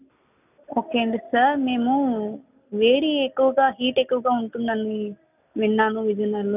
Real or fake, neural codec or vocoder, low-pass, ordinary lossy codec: real; none; 3.6 kHz; none